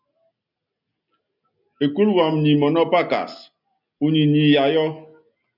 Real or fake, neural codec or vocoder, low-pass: real; none; 5.4 kHz